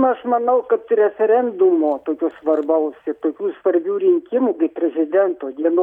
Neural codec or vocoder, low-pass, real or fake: none; 19.8 kHz; real